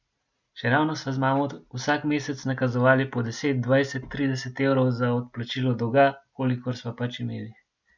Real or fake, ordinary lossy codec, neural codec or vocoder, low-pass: real; none; none; 7.2 kHz